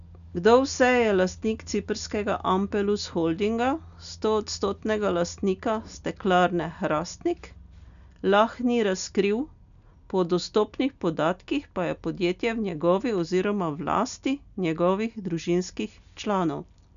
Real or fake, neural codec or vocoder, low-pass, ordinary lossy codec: real; none; 7.2 kHz; none